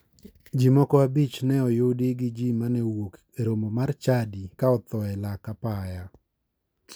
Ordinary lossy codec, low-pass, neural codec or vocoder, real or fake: none; none; none; real